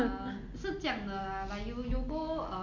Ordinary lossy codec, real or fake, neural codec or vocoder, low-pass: none; real; none; 7.2 kHz